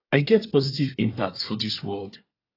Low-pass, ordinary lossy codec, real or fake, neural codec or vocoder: 5.4 kHz; AAC, 24 kbps; fake; codec, 24 kHz, 1 kbps, SNAC